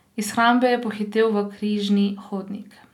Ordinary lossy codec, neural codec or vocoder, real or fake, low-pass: none; none; real; 19.8 kHz